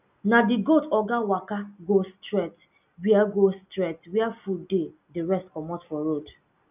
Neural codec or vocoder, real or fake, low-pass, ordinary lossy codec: none; real; 3.6 kHz; none